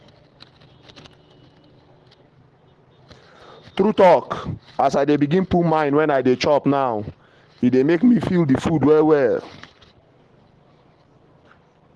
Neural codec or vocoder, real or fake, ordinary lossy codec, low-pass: none; real; Opus, 16 kbps; 10.8 kHz